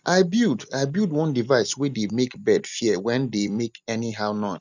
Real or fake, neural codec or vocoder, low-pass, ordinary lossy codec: real; none; 7.2 kHz; none